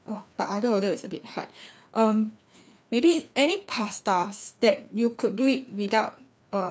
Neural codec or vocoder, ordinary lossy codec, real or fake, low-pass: codec, 16 kHz, 2 kbps, FreqCodec, larger model; none; fake; none